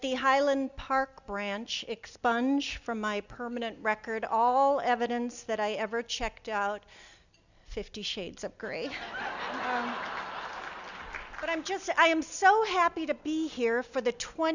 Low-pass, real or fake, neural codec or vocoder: 7.2 kHz; real; none